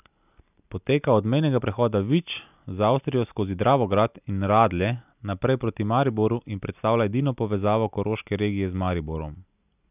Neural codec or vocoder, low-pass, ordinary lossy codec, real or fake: none; 3.6 kHz; none; real